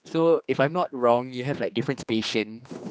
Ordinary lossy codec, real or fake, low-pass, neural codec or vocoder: none; fake; none; codec, 16 kHz, 2 kbps, X-Codec, HuBERT features, trained on general audio